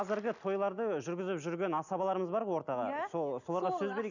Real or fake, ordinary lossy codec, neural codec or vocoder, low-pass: real; none; none; 7.2 kHz